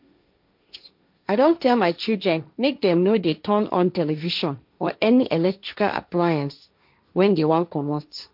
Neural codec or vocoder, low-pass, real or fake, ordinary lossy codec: codec, 16 kHz, 1.1 kbps, Voila-Tokenizer; 5.4 kHz; fake; MP3, 48 kbps